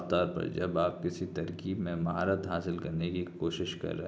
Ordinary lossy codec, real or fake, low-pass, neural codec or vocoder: none; real; none; none